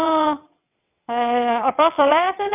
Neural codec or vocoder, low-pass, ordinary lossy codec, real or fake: vocoder, 22.05 kHz, 80 mel bands, WaveNeXt; 3.6 kHz; none; fake